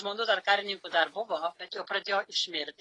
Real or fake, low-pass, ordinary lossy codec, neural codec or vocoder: real; 10.8 kHz; AAC, 32 kbps; none